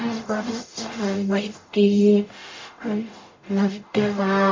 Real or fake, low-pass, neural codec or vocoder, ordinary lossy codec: fake; 7.2 kHz; codec, 44.1 kHz, 0.9 kbps, DAC; MP3, 48 kbps